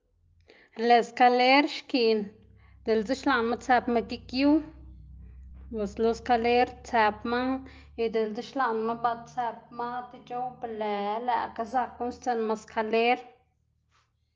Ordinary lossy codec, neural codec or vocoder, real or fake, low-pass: Opus, 24 kbps; none; real; 7.2 kHz